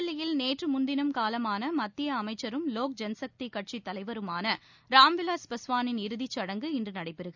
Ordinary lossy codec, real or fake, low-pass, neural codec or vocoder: none; real; 7.2 kHz; none